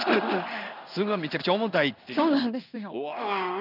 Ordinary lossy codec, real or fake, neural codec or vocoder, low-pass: none; fake; codec, 16 kHz in and 24 kHz out, 1 kbps, XY-Tokenizer; 5.4 kHz